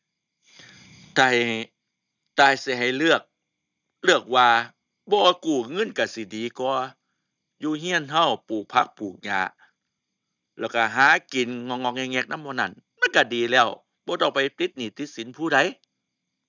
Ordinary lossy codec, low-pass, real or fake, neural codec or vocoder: none; 7.2 kHz; real; none